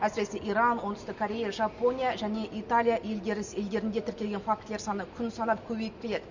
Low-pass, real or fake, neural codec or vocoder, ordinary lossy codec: 7.2 kHz; real; none; none